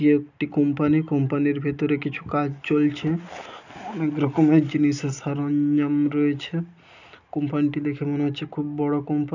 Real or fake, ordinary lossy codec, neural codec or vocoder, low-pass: real; none; none; 7.2 kHz